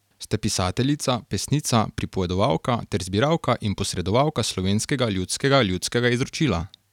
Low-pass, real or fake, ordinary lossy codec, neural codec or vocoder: 19.8 kHz; real; none; none